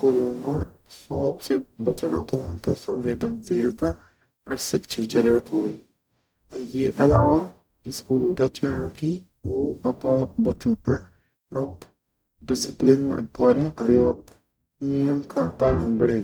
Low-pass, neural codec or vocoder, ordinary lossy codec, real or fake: none; codec, 44.1 kHz, 0.9 kbps, DAC; none; fake